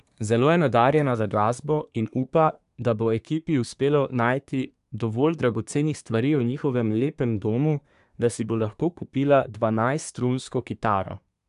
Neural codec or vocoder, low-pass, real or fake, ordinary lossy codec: codec, 24 kHz, 1 kbps, SNAC; 10.8 kHz; fake; none